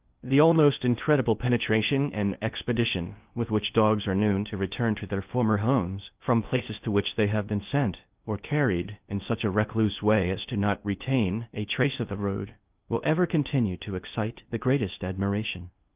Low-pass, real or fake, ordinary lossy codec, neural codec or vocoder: 3.6 kHz; fake; Opus, 32 kbps; codec, 16 kHz in and 24 kHz out, 0.6 kbps, FocalCodec, streaming, 2048 codes